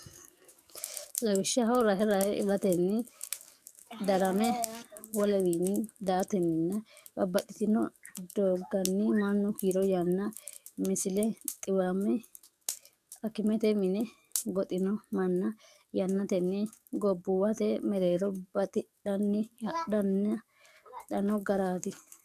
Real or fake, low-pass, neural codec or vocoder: fake; 14.4 kHz; codec, 44.1 kHz, 7.8 kbps, DAC